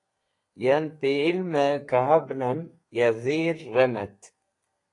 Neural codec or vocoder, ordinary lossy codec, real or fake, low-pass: codec, 32 kHz, 1.9 kbps, SNAC; AAC, 48 kbps; fake; 10.8 kHz